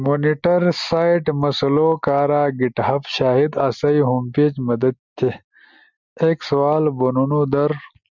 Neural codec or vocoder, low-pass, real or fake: none; 7.2 kHz; real